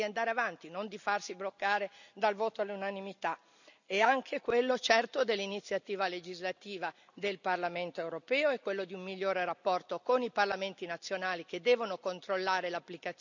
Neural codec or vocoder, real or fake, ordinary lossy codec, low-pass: none; real; none; 7.2 kHz